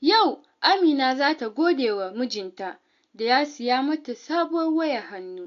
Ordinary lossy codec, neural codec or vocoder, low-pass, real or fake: AAC, 48 kbps; none; 7.2 kHz; real